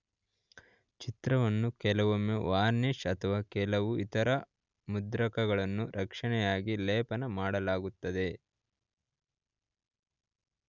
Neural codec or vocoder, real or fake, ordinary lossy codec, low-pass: none; real; none; 7.2 kHz